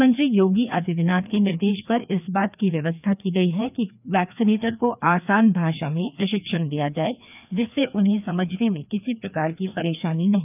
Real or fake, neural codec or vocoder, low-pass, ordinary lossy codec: fake; codec, 16 kHz, 2 kbps, FreqCodec, larger model; 3.6 kHz; none